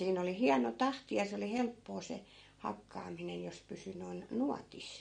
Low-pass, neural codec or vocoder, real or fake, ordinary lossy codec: 9.9 kHz; none; real; MP3, 48 kbps